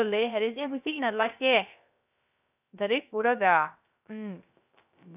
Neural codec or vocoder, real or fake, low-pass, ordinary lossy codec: codec, 16 kHz, 0.3 kbps, FocalCodec; fake; 3.6 kHz; none